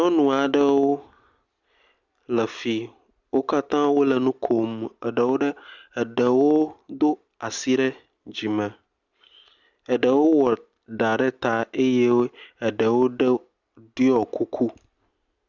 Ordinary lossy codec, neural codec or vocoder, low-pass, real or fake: Opus, 64 kbps; none; 7.2 kHz; real